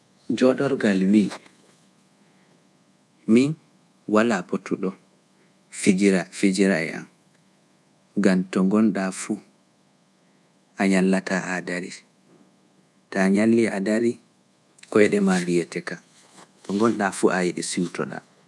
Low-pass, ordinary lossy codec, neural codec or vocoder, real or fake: none; none; codec, 24 kHz, 1.2 kbps, DualCodec; fake